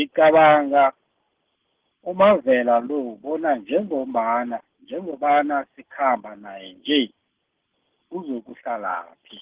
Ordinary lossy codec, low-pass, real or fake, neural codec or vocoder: Opus, 16 kbps; 3.6 kHz; real; none